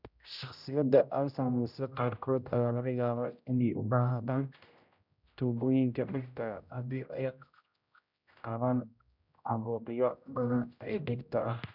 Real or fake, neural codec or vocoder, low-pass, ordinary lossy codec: fake; codec, 16 kHz, 0.5 kbps, X-Codec, HuBERT features, trained on general audio; 5.4 kHz; none